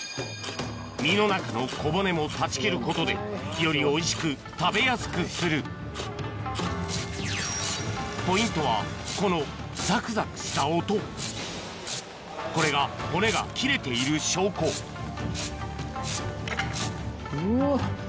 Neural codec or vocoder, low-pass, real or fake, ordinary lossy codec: none; none; real; none